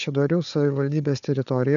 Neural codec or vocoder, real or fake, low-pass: codec, 16 kHz, 4 kbps, FunCodec, trained on Chinese and English, 50 frames a second; fake; 7.2 kHz